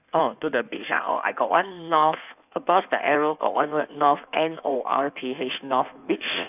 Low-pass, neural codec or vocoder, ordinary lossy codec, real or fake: 3.6 kHz; codec, 16 kHz in and 24 kHz out, 1.1 kbps, FireRedTTS-2 codec; none; fake